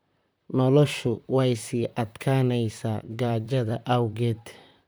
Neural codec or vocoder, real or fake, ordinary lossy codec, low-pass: vocoder, 44.1 kHz, 128 mel bands, Pupu-Vocoder; fake; none; none